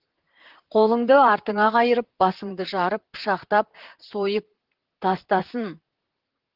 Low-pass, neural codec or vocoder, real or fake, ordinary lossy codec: 5.4 kHz; vocoder, 44.1 kHz, 128 mel bands, Pupu-Vocoder; fake; Opus, 16 kbps